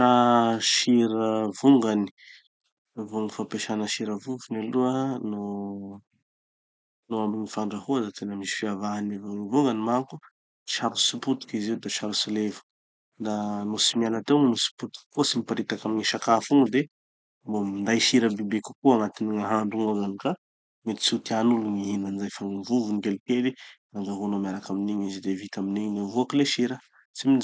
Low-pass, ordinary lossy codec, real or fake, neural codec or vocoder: none; none; real; none